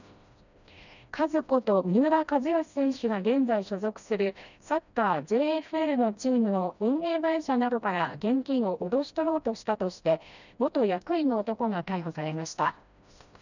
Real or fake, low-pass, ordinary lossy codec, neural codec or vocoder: fake; 7.2 kHz; none; codec, 16 kHz, 1 kbps, FreqCodec, smaller model